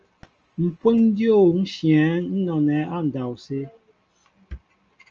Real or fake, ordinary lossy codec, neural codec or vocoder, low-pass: real; Opus, 24 kbps; none; 7.2 kHz